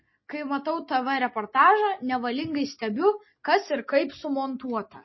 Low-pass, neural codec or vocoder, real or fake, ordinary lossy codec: 7.2 kHz; none; real; MP3, 24 kbps